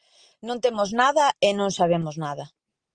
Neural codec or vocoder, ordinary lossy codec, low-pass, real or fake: none; Opus, 24 kbps; 9.9 kHz; real